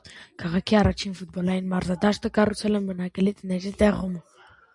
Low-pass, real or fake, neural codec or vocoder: 10.8 kHz; real; none